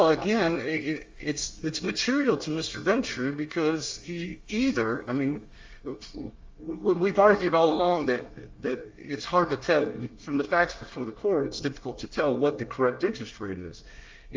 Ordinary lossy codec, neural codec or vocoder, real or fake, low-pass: Opus, 32 kbps; codec, 24 kHz, 1 kbps, SNAC; fake; 7.2 kHz